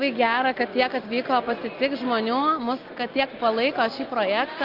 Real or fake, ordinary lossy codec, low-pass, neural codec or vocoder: real; Opus, 24 kbps; 5.4 kHz; none